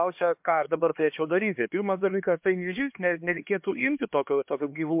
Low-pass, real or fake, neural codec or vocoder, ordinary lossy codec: 3.6 kHz; fake; codec, 16 kHz, 2 kbps, X-Codec, HuBERT features, trained on LibriSpeech; MP3, 32 kbps